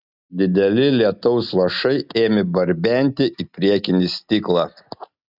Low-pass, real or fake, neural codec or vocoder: 5.4 kHz; real; none